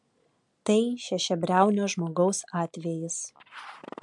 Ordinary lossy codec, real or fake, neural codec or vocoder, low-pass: MP3, 64 kbps; real; none; 10.8 kHz